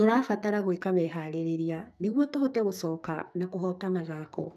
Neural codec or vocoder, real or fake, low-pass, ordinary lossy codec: codec, 44.1 kHz, 2.6 kbps, SNAC; fake; 14.4 kHz; none